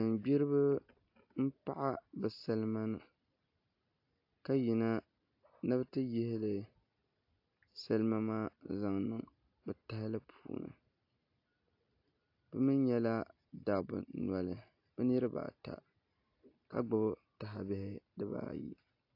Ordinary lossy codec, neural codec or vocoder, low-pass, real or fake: AAC, 32 kbps; none; 5.4 kHz; real